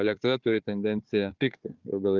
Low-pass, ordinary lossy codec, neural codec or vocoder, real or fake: 7.2 kHz; Opus, 24 kbps; codec, 16 kHz, 4 kbps, FunCodec, trained on Chinese and English, 50 frames a second; fake